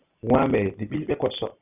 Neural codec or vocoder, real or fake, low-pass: none; real; 3.6 kHz